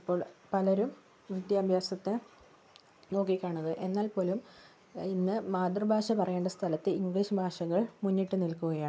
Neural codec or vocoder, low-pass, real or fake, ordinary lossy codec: none; none; real; none